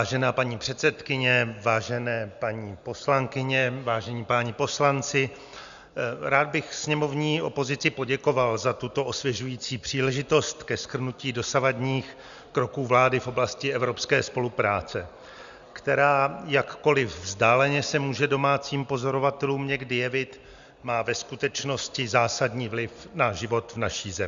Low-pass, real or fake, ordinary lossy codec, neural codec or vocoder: 7.2 kHz; real; Opus, 64 kbps; none